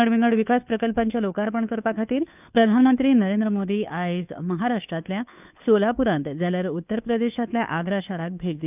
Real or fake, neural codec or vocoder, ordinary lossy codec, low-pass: fake; codec, 16 kHz, 2 kbps, FunCodec, trained on Chinese and English, 25 frames a second; none; 3.6 kHz